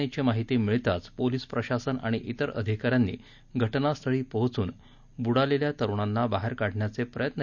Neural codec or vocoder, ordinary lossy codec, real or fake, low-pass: none; none; real; 7.2 kHz